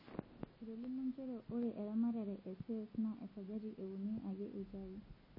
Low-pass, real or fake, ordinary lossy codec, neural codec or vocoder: 5.4 kHz; real; MP3, 24 kbps; none